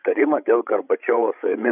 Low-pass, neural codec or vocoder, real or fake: 3.6 kHz; codec, 16 kHz, 16 kbps, FreqCodec, larger model; fake